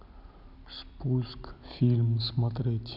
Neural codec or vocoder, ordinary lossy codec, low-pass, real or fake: none; none; 5.4 kHz; real